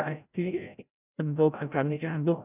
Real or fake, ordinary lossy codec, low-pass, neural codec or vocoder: fake; none; 3.6 kHz; codec, 16 kHz, 0.5 kbps, FreqCodec, larger model